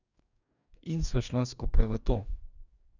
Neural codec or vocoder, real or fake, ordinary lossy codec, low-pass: codec, 44.1 kHz, 2.6 kbps, DAC; fake; none; 7.2 kHz